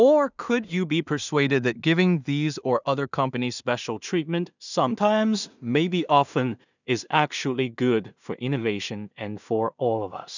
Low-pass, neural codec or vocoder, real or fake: 7.2 kHz; codec, 16 kHz in and 24 kHz out, 0.4 kbps, LongCat-Audio-Codec, two codebook decoder; fake